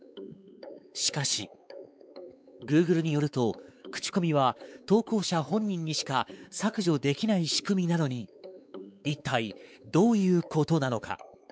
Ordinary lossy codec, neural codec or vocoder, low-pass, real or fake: none; codec, 16 kHz, 4 kbps, X-Codec, WavLM features, trained on Multilingual LibriSpeech; none; fake